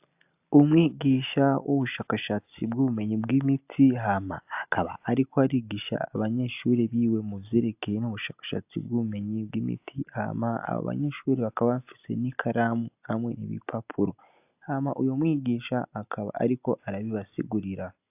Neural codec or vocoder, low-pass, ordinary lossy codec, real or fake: none; 3.6 kHz; AAC, 32 kbps; real